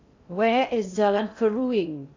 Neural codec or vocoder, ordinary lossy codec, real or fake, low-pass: codec, 16 kHz in and 24 kHz out, 0.6 kbps, FocalCodec, streaming, 2048 codes; AAC, 48 kbps; fake; 7.2 kHz